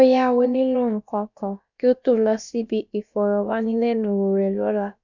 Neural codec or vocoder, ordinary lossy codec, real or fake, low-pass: codec, 16 kHz, about 1 kbps, DyCAST, with the encoder's durations; none; fake; 7.2 kHz